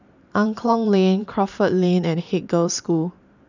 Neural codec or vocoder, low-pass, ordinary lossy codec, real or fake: vocoder, 44.1 kHz, 128 mel bands every 512 samples, BigVGAN v2; 7.2 kHz; none; fake